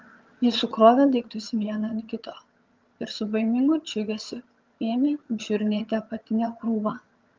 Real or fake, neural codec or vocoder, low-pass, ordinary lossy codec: fake; vocoder, 22.05 kHz, 80 mel bands, HiFi-GAN; 7.2 kHz; Opus, 32 kbps